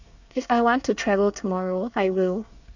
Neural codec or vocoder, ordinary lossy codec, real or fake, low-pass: codec, 24 kHz, 1 kbps, SNAC; none; fake; 7.2 kHz